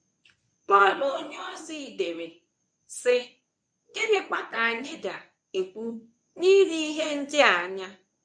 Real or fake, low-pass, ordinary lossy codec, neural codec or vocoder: fake; 9.9 kHz; none; codec, 24 kHz, 0.9 kbps, WavTokenizer, medium speech release version 1